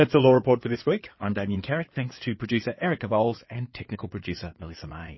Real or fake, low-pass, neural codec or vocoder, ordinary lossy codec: fake; 7.2 kHz; codec, 16 kHz in and 24 kHz out, 2.2 kbps, FireRedTTS-2 codec; MP3, 24 kbps